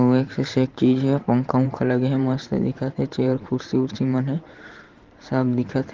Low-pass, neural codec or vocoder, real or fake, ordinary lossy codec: 7.2 kHz; vocoder, 44.1 kHz, 128 mel bands every 512 samples, BigVGAN v2; fake; Opus, 16 kbps